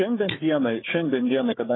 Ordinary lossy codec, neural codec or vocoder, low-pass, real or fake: AAC, 16 kbps; codec, 16 kHz, 4 kbps, FunCodec, trained on Chinese and English, 50 frames a second; 7.2 kHz; fake